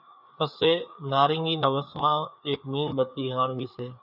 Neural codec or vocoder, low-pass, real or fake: codec, 16 kHz, 4 kbps, FreqCodec, larger model; 5.4 kHz; fake